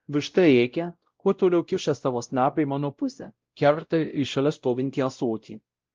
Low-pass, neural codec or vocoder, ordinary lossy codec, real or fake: 7.2 kHz; codec, 16 kHz, 0.5 kbps, X-Codec, WavLM features, trained on Multilingual LibriSpeech; Opus, 24 kbps; fake